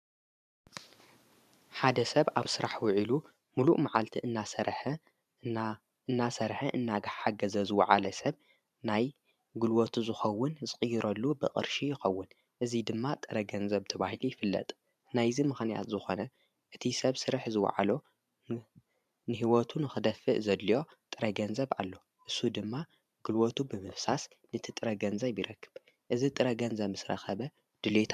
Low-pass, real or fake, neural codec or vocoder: 14.4 kHz; fake; vocoder, 44.1 kHz, 128 mel bands every 512 samples, BigVGAN v2